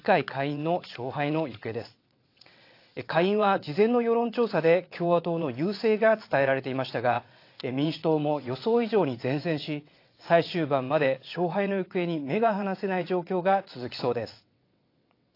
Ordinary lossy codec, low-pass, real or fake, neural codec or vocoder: AAC, 32 kbps; 5.4 kHz; fake; vocoder, 22.05 kHz, 80 mel bands, WaveNeXt